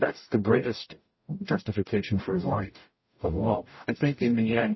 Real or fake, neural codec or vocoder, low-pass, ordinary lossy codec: fake; codec, 44.1 kHz, 0.9 kbps, DAC; 7.2 kHz; MP3, 24 kbps